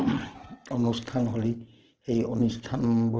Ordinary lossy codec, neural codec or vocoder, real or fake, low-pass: Opus, 16 kbps; none; real; 7.2 kHz